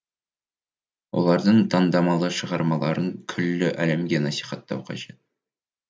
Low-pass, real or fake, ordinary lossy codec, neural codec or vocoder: none; real; none; none